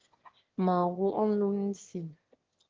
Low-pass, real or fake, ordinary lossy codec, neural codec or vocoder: 7.2 kHz; fake; Opus, 16 kbps; autoencoder, 22.05 kHz, a latent of 192 numbers a frame, VITS, trained on one speaker